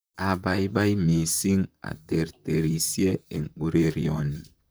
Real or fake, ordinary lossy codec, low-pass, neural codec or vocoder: fake; none; none; vocoder, 44.1 kHz, 128 mel bands, Pupu-Vocoder